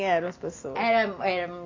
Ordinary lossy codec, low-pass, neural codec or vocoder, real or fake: AAC, 32 kbps; 7.2 kHz; none; real